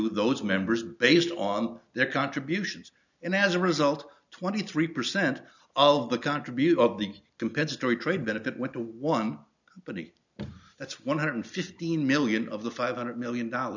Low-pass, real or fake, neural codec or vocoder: 7.2 kHz; real; none